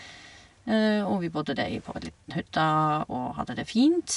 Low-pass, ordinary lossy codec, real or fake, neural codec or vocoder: 10.8 kHz; none; real; none